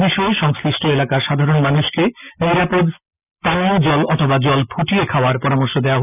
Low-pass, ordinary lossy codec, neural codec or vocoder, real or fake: 3.6 kHz; none; none; real